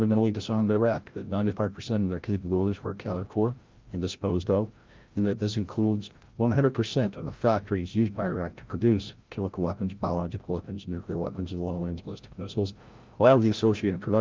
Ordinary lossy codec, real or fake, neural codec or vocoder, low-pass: Opus, 32 kbps; fake; codec, 16 kHz, 0.5 kbps, FreqCodec, larger model; 7.2 kHz